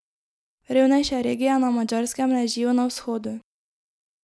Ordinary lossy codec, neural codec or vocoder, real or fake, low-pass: none; none; real; none